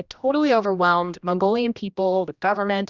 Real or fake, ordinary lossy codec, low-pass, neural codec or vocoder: fake; Opus, 64 kbps; 7.2 kHz; codec, 16 kHz, 1 kbps, X-Codec, HuBERT features, trained on general audio